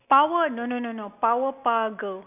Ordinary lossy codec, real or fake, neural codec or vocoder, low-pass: none; real; none; 3.6 kHz